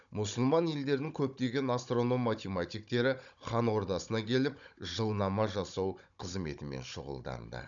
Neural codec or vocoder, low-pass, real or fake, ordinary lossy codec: codec, 16 kHz, 16 kbps, FunCodec, trained on Chinese and English, 50 frames a second; 7.2 kHz; fake; none